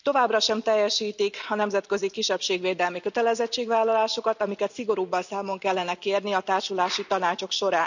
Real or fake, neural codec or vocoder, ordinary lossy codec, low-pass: real; none; none; 7.2 kHz